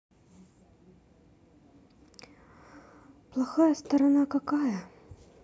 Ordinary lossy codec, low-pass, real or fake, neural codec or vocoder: none; none; real; none